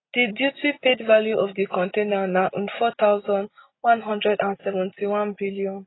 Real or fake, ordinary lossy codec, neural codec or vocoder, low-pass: real; AAC, 16 kbps; none; 7.2 kHz